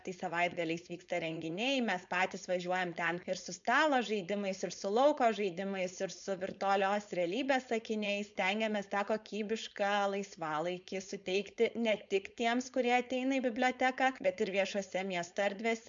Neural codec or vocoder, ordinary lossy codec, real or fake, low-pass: codec, 16 kHz, 4.8 kbps, FACodec; MP3, 64 kbps; fake; 7.2 kHz